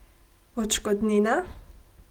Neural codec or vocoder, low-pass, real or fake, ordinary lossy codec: none; 19.8 kHz; real; Opus, 24 kbps